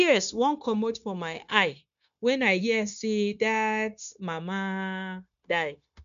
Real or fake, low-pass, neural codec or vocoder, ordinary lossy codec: fake; 7.2 kHz; codec, 16 kHz, 0.9 kbps, LongCat-Audio-Codec; none